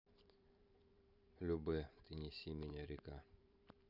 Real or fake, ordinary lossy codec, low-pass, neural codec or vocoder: fake; none; 5.4 kHz; vocoder, 44.1 kHz, 128 mel bands every 512 samples, BigVGAN v2